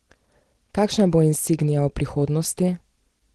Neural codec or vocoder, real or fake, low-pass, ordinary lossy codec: none; real; 10.8 kHz; Opus, 16 kbps